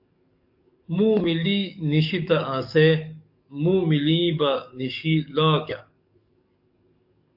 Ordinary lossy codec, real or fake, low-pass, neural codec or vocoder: AAC, 48 kbps; fake; 5.4 kHz; codec, 44.1 kHz, 7.8 kbps, DAC